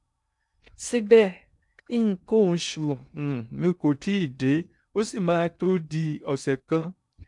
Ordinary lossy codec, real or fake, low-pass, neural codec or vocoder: none; fake; 10.8 kHz; codec, 16 kHz in and 24 kHz out, 0.6 kbps, FocalCodec, streaming, 2048 codes